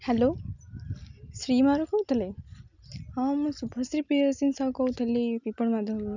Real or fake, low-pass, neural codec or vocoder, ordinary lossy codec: real; 7.2 kHz; none; none